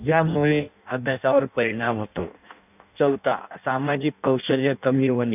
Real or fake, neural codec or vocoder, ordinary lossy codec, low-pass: fake; codec, 16 kHz in and 24 kHz out, 0.6 kbps, FireRedTTS-2 codec; none; 3.6 kHz